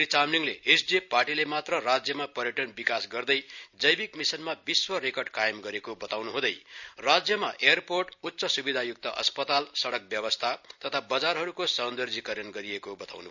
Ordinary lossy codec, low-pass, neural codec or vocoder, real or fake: none; 7.2 kHz; none; real